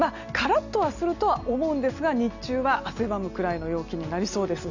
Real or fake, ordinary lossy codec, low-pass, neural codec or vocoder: real; none; 7.2 kHz; none